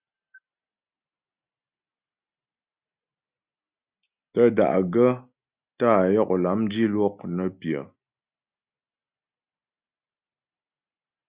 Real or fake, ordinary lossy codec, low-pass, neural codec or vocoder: real; Opus, 64 kbps; 3.6 kHz; none